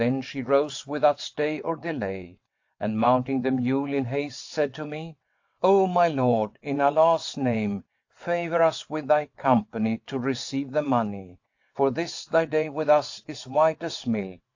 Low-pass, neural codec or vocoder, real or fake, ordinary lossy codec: 7.2 kHz; vocoder, 22.05 kHz, 80 mel bands, WaveNeXt; fake; AAC, 48 kbps